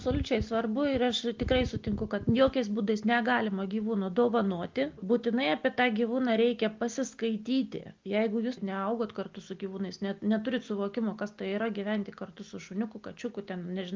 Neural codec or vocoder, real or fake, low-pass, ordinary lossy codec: none; real; 7.2 kHz; Opus, 32 kbps